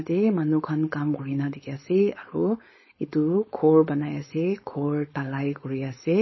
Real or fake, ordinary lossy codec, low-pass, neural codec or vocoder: fake; MP3, 24 kbps; 7.2 kHz; codec, 16 kHz, 4.8 kbps, FACodec